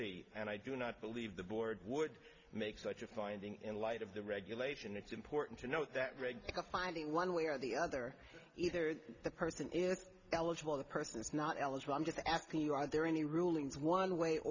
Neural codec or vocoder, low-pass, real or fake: none; 7.2 kHz; real